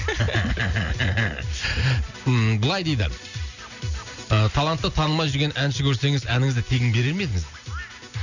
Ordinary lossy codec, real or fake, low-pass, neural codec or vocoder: none; real; 7.2 kHz; none